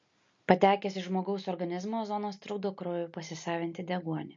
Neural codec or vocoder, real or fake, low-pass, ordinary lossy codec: none; real; 7.2 kHz; MP3, 48 kbps